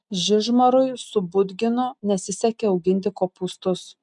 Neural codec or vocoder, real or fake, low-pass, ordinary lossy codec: none; real; 10.8 kHz; MP3, 96 kbps